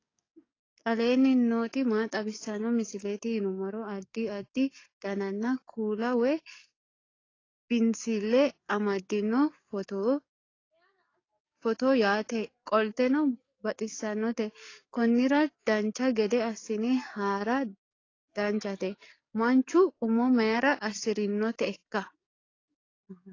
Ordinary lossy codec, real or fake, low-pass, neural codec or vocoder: AAC, 32 kbps; fake; 7.2 kHz; codec, 44.1 kHz, 7.8 kbps, DAC